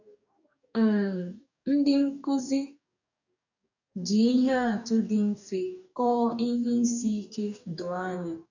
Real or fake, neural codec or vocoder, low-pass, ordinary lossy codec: fake; codec, 44.1 kHz, 2.6 kbps, DAC; 7.2 kHz; none